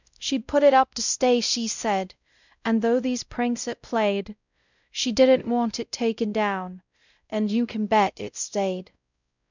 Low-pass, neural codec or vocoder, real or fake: 7.2 kHz; codec, 16 kHz, 0.5 kbps, X-Codec, WavLM features, trained on Multilingual LibriSpeech; fake